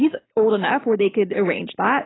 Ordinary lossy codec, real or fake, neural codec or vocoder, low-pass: AAC, 16 kbps; real; none; 7.2 kHz